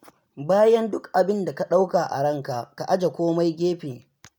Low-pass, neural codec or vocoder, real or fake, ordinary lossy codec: none; none; real; none